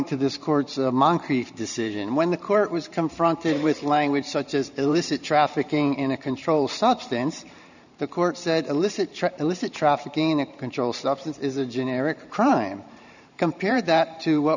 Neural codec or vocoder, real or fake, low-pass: vocoder, 44.1 kHz, 128 mel bands every 512 samples, BigVGAN v2; fake; 7.2 kHz